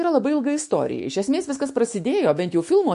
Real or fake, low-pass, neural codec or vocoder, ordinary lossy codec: fake; 14.4 kHz; autoencoder, 48 kHz, 128 numbers a frame, DAC-VAE, trained on Japanese speech; MP3, 48 kbps